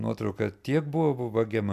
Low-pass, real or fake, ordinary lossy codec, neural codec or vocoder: 14.4 kHz; real; AAC, 96 kbps; none